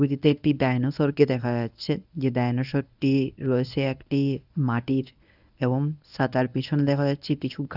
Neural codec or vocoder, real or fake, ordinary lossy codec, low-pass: codec, 24 kHz, 0.9 kbps, WavTokenizer, medium speech release version 1; fake; none; 5.4 kHz